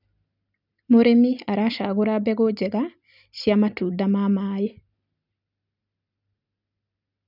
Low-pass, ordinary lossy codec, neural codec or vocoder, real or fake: 5.4 kHz; none; none; real